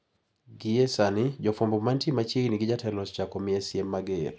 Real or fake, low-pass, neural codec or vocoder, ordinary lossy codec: real; none; none; none